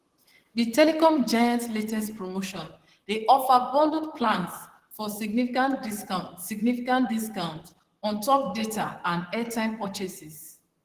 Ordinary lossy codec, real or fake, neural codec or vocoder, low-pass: Opus, 16 kbps; fake; vocoder, 44.1 kHz, 128 mel bands, Pupu-Vocoder; 14.4 kHz